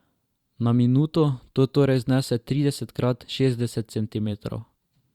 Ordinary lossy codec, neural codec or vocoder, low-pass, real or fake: Opus, 64 kbps; vocoder, 44.1 kHz, 128 mel bands every 512 samples, BigVGAN v2; 19.8 kHz; fake